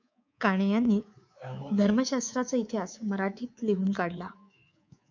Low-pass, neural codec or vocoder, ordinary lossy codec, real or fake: 7.2 kHz; codec, 24 kHz, 3.1 kbps, DualCodec; MP3, 64 kbps; fake